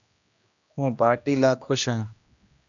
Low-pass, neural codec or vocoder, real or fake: 7.2 kHz; codec, 16 kHz, 1 kbps, X-Codec, HuBERT features, trained on general audio; fake